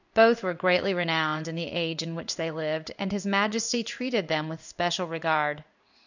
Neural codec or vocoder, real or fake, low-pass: codec, 16 kHz in and 24 kHz out, 1 kbps, XY-Tokenizer; fake; 7.2 kHz